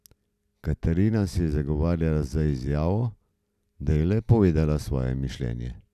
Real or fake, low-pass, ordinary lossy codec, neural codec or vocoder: real; 14.4 kHz; none; none